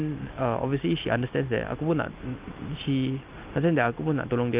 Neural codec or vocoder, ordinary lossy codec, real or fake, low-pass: none; Opus, 32 kbps; real; 3.6 kHz